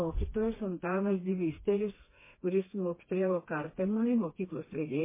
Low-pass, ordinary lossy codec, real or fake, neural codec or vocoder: 3.6 kHz; MP3, 16 kbps; fake; codec, 16 kHz, 2 kbps, FreqCodec, smaller model